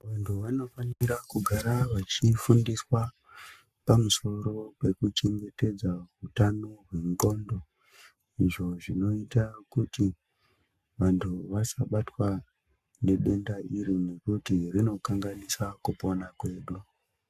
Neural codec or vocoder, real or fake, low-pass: codec, 44.1 kHz, 7.8 kbps, Pupu-Codec; fake; 14.4 kHz